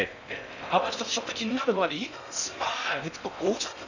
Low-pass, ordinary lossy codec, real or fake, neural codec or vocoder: 7.2 kHz; none; fake; codec, 16 kHz in and 24 kHz out, 0.6 kbps, FocalCodec, streaming, 4096 codes